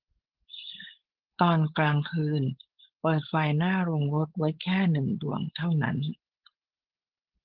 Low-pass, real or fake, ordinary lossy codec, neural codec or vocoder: 5.4 kHz; fake; Opus, 16 kbps; codec, 16 kHz, 4.8 kbps, FACodec